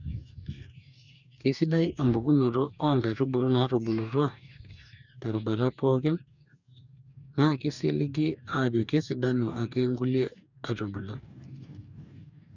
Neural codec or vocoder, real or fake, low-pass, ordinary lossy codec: codec, 44.1 kHz, 2.6 kbps, DAC; fake; 7.2 kHz; none